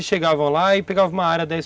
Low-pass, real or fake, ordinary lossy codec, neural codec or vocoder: none; real; none; none